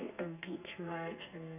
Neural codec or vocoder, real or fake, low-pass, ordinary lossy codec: codec, 32 kHz, 1.9 kbps, SNAC; fake; 3.6 kHz; none